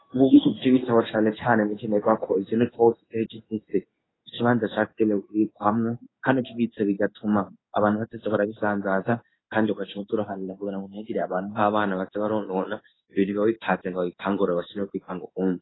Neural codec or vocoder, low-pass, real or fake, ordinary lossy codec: codec, 16 kHz in and 24 kHz out, 1 kbps, XY-Tokenizer; 7.2 kHz; fake; AAC, 16 kbps